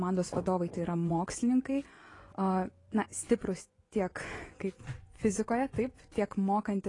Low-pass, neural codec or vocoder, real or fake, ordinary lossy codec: 10.8 kHz; vocoder, 24 kHz, 100 mel bands, Vocos; fake; AAC, 32 kbps